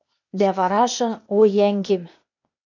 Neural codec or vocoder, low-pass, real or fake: codec, 16 kHz, 0.8 kbps, ZipCodec; 7.2 kHz; fake